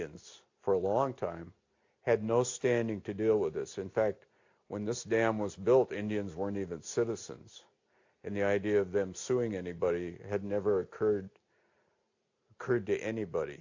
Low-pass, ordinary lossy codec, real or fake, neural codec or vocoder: 7.2 kHz; AAC, 48 kbps; real; none